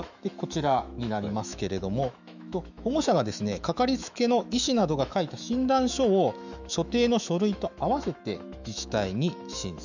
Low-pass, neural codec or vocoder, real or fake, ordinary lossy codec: 7.2 kHz; autoencoder, 48 kHz, 128 numbers a frame, DAC-VAE, trained on Japanese speech; fake; none